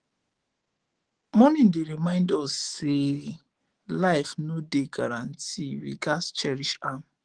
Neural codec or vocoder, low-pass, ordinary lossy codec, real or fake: autoencoder, 48 kHz, 128 numbers a frame, DAC-VAE, trained on Japanese speech; 9.9 kHz; Opus, 16 kbps; fake